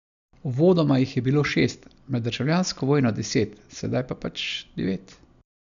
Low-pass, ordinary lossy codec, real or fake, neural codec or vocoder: 7.2 kHz; none; real; none